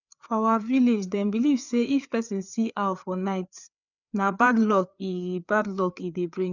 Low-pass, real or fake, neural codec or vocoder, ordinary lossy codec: 7.2 kHz; fake; codec, 16 kHz, 4 kbps, FreqCodec, larger model; none